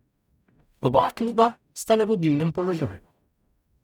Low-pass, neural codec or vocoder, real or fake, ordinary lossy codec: 19.8 kHz; codec, 44.1 kHz, 0.9 kbps, DAC; fake; none